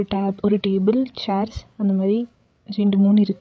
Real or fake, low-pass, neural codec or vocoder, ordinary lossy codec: fake; none; codec, 16 kHz, 8 kbps, FreqCodec, larger model; none